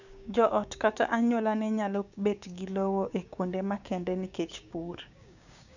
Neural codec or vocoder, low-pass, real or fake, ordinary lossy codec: codec, 16 kHz, 6 kbps, DAC; 7.2 kHz; fake; none